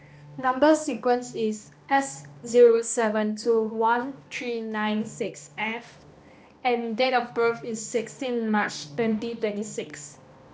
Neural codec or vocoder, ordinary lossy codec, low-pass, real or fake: codec, 16 kHz, 1 kbps, X-Codec, HuBERT features, trained on balanced general audio; none; none; fake